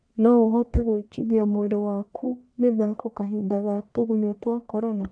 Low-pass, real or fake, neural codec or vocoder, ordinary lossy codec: 9.9 kHz; fake; codec, 44.1 kHz, 1.7 kbps, Pupu-Codec; none